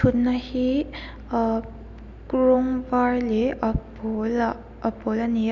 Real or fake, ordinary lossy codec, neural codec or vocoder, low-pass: real; none; none; 7.2 kHz